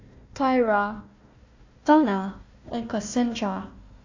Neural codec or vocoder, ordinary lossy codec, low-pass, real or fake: codec, 16 kHz, 1 kbps, FunCodec, trained on Chinese and English, 50 frames a second; AAC, 48 kbps; 7.2 kHz; fake